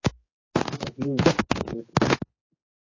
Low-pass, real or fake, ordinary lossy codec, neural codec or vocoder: 7.2 kHz; fake; MP3, 32 kbps; codec, 16 kHz, 2 kbps, X-Codec, HuBERT features, trained on general audio